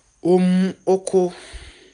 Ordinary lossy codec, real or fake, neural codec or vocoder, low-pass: none; real; none; 9.9 kHz